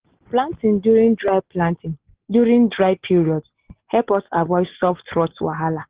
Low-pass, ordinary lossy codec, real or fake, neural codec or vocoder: 3.6 kHz; Opus, 16 kbps; real; none